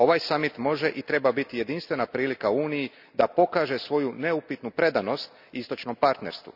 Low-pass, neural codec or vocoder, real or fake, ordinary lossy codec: 5.4 kHz; none; real; none